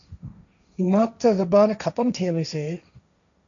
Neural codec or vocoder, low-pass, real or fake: codec, 16 kHz, 1.1 kbps, Voila-Tokenizer; 7.2 kHz; fake